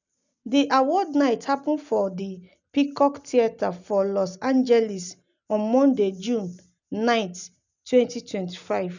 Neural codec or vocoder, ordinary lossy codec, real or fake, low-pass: none; none; real; 7.2 kHz